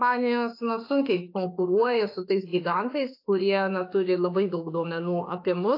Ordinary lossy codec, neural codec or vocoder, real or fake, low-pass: AAC, 32 kbps; autoencoder, 48 kHz, 32 numbers a frame, DAC-VAE, trained on Japanese speech; fake; 5.4 kHz